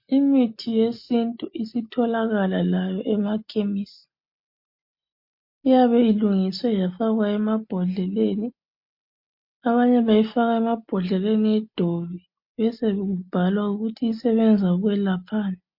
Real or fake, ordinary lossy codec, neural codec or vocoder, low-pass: real; MP3, 32 kbps; none; 5.4 kHz